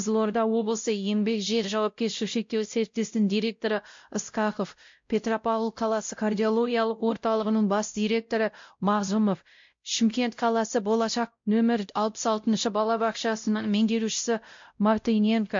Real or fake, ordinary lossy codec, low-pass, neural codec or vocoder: fake; MP3, 48 kbps; 7.2 kHz; codec, 16 kHz, 0.5 kbps, X-Codec, WavLM features, trained on Multilingual LibriSpeech